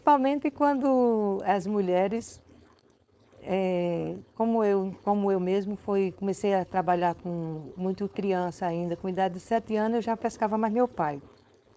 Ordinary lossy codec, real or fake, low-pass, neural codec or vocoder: none; fake; none; codec, 16 kHz, 4.8 kbps, FACodec